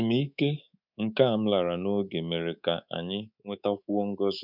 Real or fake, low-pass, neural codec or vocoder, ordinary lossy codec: fake; 5.4 kHz; codec, 24 kHz, 3.1 kbps, DualCodec; none